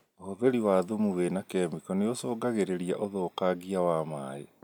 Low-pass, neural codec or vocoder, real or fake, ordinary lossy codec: none; none; real; none